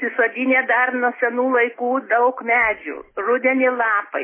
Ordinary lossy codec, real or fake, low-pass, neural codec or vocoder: MP3, 16 kbps; real; 3.6 kHz; none